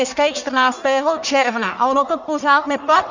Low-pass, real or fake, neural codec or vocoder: 7.2 kHz; fake; codec, 44.1 kHz, 1.7 kbps, Pupu-Codec